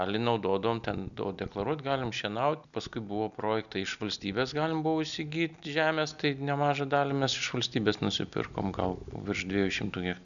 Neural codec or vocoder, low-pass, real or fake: none; 7.2 kHz; real